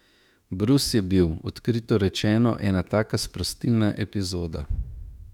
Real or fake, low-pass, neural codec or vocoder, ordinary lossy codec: fake; 19.8 kHz; autoencoder, 48 kHz, 32 numbers a frame, DAC-VAE, trained on Japanese speech; none